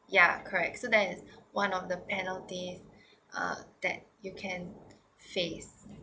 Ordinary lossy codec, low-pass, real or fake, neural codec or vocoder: none; none; real; none